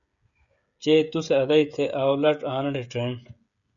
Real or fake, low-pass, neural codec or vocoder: fake; 7.2 kHz; codec, 16 kHz, 16 kbps, FreqCodec, smaller model